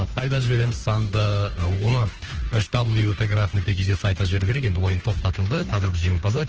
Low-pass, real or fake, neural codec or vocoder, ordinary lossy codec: 7.2 kHz; fake; codec, 16 kHz, 2 kbps, FunCodec, trained on Chinese and English, 25 frames a second; Opus, 16 kbps